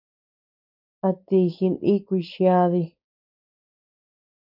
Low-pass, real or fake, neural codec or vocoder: 5.4 kHz; fake; codec, 16 kHz, 4.8 kbps, FACodec